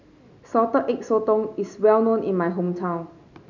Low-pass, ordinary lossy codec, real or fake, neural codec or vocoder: 7.2 kHz; none; real; none